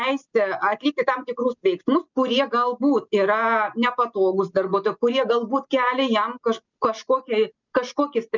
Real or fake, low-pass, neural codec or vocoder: real; 7.2 kHz; none